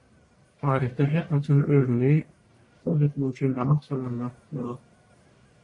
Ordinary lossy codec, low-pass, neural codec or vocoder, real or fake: MP3, 48 kbps; 10.8 kHz; codec, 44.1 kHz, 1.7 kbps, Pupu-Codec; fake